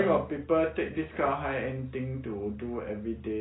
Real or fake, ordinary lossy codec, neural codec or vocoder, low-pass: real; AAC, 16 kbps; none; 7.2 kHz